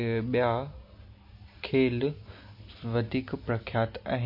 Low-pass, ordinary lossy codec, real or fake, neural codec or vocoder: 5.4 kHz; MP3, 32 kbps; real; none